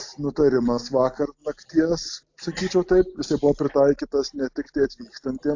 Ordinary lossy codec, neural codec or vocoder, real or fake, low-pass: AAC, 48 kbps; none; real; 7.2 kHz